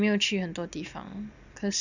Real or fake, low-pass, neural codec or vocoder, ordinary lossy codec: real; 7.2 kHz; none; none